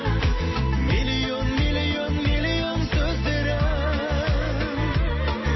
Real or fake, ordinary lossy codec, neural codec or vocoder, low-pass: real; MP3, 24 kbps; none; 7.2 kHz